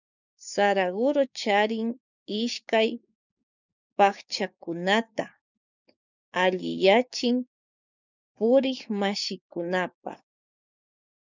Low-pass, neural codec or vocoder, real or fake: 7.2 kHz; codec, 16 kHz, 6 kbps, DAC; fake